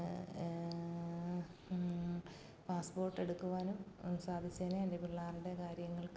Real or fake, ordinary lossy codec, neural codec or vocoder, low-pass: real; none; none; none